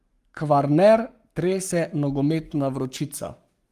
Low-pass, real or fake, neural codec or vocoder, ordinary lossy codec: 14.4 kHz; fake; codec, 44.1 kHz, 7.8 kbps, Pupu-Codec; Opus, 32 kbps